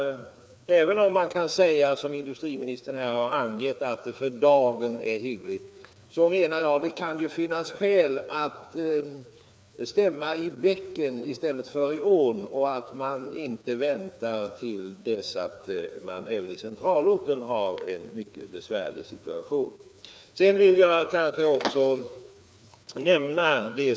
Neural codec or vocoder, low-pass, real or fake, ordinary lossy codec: codec, 16 kHz, 2 kbps, FreqCodec, larger model; none; fake; none